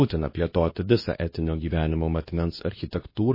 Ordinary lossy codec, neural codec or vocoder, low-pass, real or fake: MP3, 24 kbps; codec, 24 kHz, 0.9 kbps, WavTokenizer, medium speech release version 2; 5.4 kHz; fake